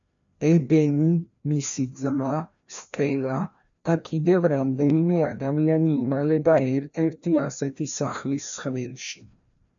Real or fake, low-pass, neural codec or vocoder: fake; 7.2 kHz; codec, 16 kHz, 1 kbps, FreqCodec, larger model